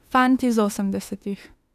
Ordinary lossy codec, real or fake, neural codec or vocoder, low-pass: none; fake; autoencoder, 48 kHz, 32 numbers a frame, DAC-VAE, trained on Japanese speech; 14.4 kHz